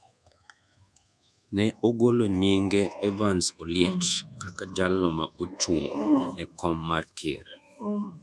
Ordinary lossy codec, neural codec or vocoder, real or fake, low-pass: none; codec, 24 kHz, 1.2 kbps, DualCodec; fake; none